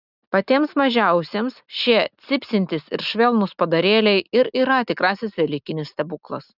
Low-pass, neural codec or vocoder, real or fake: 5.4 kHz; none; real